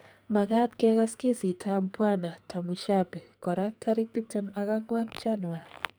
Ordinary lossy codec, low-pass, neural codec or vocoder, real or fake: none; none; codec, 44.1 kHz, 2.6 kbps, SNAC; fake